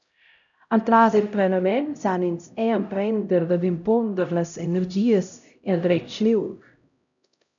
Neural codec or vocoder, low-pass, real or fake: codec, 16 kHz, 0.5 kbps, X-Codec, HuBERT features, trained on LibriSpeech; 7.2 kHz; fake